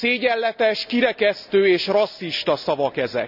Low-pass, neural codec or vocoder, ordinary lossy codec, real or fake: 5.4 kHz; none; none; real